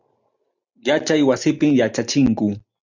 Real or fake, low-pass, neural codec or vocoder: real; 7.2 kHz; none